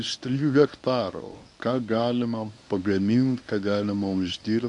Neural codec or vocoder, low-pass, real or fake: codec, 24 kHz, 0.9 kbps, WavTokenizer, medium speech release version 1; 10.8 kHz; fake